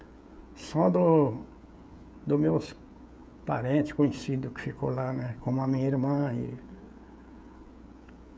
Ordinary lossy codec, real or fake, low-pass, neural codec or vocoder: none; fake; none; codec, 16 kHz, 16 kbps, FreqCodec, smaller model